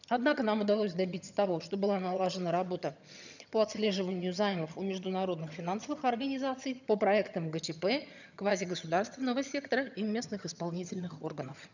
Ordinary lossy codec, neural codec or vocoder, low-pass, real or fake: none; vocoder, 22.05 kHz, 80 mel bands, HiFi-GAN; 7.2 kHz; fake